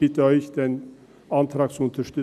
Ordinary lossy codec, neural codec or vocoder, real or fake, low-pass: none; none; real; 14.4 kHz